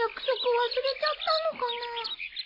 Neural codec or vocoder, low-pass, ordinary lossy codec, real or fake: none; 5.4 kHz; AAC, 24 kbps; real